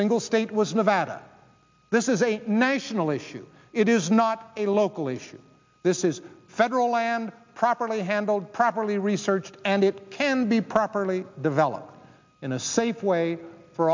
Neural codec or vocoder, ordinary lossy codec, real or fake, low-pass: none; MP3, 64 kbps; real; 7.2 kHz